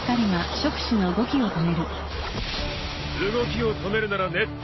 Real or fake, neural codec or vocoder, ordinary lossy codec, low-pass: real; none; MP3, 24 kbps; 7.2 kHz